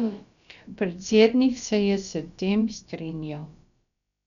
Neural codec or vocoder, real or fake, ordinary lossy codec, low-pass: codec, 16 kHz, about 1 kbps, DyCAST, with the encoder's durations; fake; none; 7.2 kHz